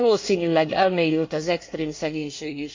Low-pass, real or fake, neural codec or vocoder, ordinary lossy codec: 7.2 kHz; fake; codec, 24 kHz, 1 kbps, SNAC; MP3, 48 kbps